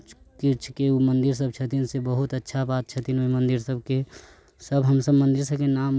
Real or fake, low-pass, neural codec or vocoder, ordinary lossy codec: real; none; none; none